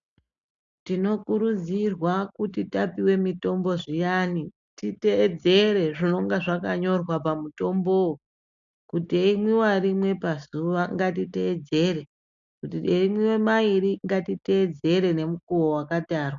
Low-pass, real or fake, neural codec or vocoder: 7.2 kHz; real; none